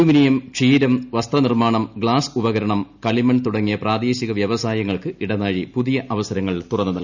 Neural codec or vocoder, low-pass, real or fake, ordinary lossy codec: none; 7.2 kHz; real; none